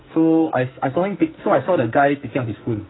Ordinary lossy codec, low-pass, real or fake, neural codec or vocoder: AAC, 16 kbps; 7.2 kHz; fake; codec, 44.1 kHz, 2.6 kbps, SNAC